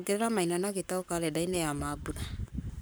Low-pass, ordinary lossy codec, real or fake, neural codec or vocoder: none; none; fake; codec, 44.1 kHz, 7.8 kbps, Pupu-Codec